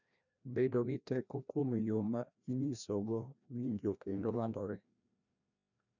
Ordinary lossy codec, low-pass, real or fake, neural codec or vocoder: none; 7.2 kHz; fake; codec, 16 kHz, 1 kbps, FreqCodec, larger model